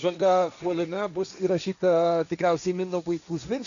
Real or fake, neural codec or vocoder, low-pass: fake; codec, 16 kHz, 1.1 kbps, Voila-Tokenizer; 7.2 kHz